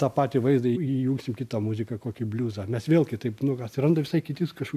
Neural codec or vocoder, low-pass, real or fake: none; 14.4 kHz; real